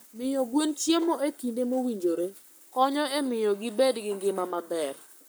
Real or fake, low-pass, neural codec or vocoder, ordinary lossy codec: fake; none; codec, 44.1 kHz, 7.8 kbps, Pupu-Codec; none